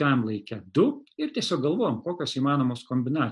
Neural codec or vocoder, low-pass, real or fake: none; 10.8 kHz; real